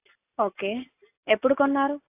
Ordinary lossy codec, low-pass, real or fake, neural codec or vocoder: AAC, 24 kbps; 3.6 kHz; real; none